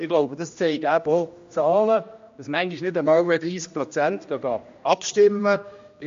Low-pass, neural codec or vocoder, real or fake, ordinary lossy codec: 7.2 kHz; codec, 16 kHz, 1 kbps, X-Codec, HuBERT features, trained on general audio; fake; MP3, 48 kbps